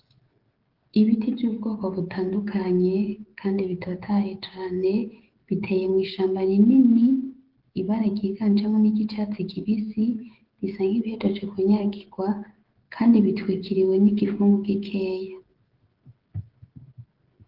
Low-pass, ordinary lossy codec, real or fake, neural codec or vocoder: 5.4 kHz; Opus, 16 kbps; fake; codec, 16 kHz, 16 kbps, FreqCodec, smaller model